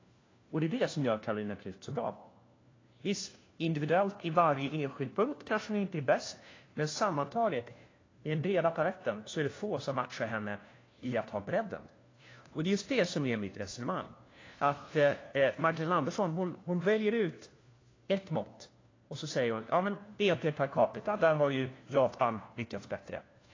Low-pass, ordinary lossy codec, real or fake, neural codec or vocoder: 7.2 kHz; AAC, 32 kbps; fake; codec, 16 kHz, 1 kbps, FunCodec, trained on LibriTTS, 50 frames a second